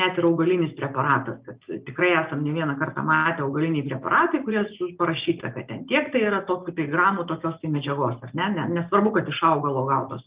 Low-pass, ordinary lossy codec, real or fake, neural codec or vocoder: 3.6 kHz; Opus, 32 kbps; real; none